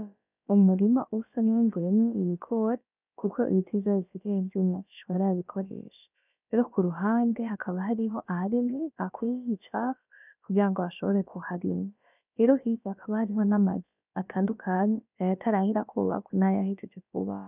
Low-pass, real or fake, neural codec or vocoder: 3.6 kHz; fake; codec, 16 kHz, about 1 kbps, DyCAST, with the encoder's durations